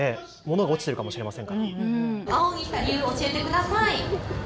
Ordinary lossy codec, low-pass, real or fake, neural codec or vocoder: none; none; real; none